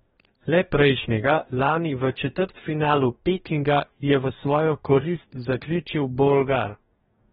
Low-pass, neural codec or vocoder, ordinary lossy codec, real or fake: 19.8 kHz; codec, 44.1 kHz, 2.6 kbps, DAC; AAC, 16 kbps; fake